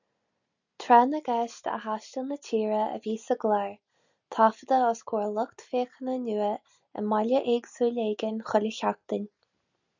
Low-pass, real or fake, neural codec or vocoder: 7.2 kHz; real; none